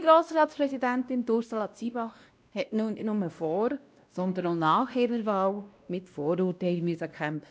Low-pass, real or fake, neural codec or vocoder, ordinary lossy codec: none; fake; codec, 16 kHz, 1 kbps, X-Codec, WavLM features, trained on Multilingual LibriSpeech; none